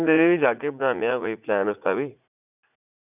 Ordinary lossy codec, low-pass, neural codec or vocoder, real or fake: none; 3.6 kHz; vocoder, 44.1 kHz, 80 mel bands, Vocos; fake